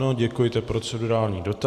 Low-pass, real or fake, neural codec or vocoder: 14.4 kHz; real; none